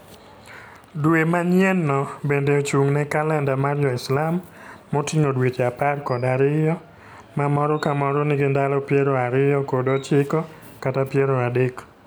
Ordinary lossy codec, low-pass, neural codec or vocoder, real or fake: none; none; none; real